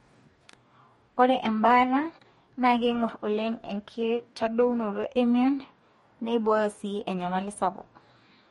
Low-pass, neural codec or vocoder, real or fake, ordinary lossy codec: 19.8 kHz; codec, 44.1 kHz, 2.6 kbps, DAC; fake; MP3, 48 kbps